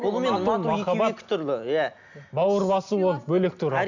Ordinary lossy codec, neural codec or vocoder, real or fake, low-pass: none; none; real; 7.2 kHz